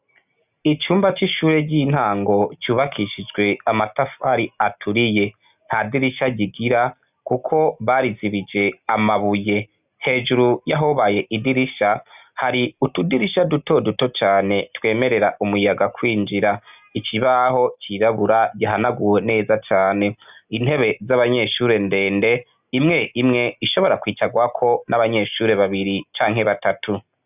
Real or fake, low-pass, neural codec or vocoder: real; 3.6 kHz; none